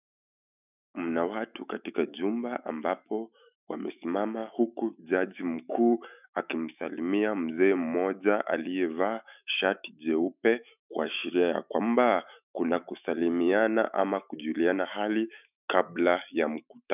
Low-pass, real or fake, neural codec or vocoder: 3.6 kHz; fake; autoencoder, 48 kHz, 128 numbers a frame, DAC-VAE, trained on Japanese speech